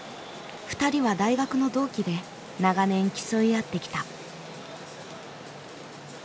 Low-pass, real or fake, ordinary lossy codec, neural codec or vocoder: none; real; none; none